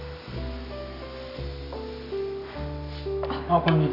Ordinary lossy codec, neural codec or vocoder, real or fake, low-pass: none; none; real; 5.4 kHz